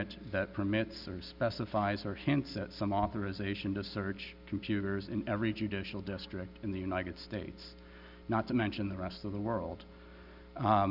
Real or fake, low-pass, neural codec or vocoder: real; 5.4 kHz; none